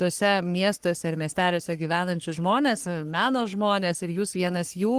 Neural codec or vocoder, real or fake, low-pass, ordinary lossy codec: codec, 44.1 kHz, 3.4 kbps, Pupu-Codec; fake; 14.4 kHz; Opus, 24 kbps